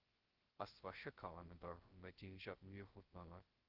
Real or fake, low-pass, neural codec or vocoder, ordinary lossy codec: fake; 5.4 kHz; codec, 16 kHz, 0.2 kbps, FocalCodec; Opus, 16 kbps